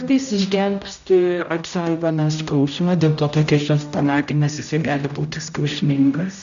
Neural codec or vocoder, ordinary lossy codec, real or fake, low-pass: codec, 16 kHz, 0.5 kbps, X-Codec, HuBERT features, trained on general audio; AAC, 96 kbps; fake; 7.2 kHz